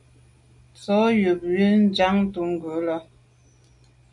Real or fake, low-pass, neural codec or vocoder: real; 10.8 kHz; none